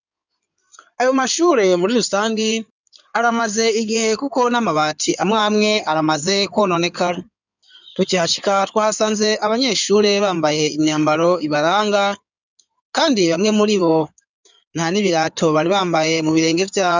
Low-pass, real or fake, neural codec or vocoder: 7.2 kHz; fake; codec, 16 kHz in and 24 kHz out, 2.2 kbps, FireRedTTS-2 codec